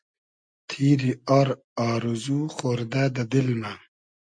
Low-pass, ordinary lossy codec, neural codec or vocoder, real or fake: 9.9 kHz; MP3, 64 kbps; none; real